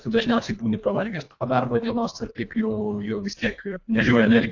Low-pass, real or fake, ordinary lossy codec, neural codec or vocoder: 7.2 kHz; fake; AAC, 48 kbps; codec, 24 kHz, 1.5 kbps, HILCodec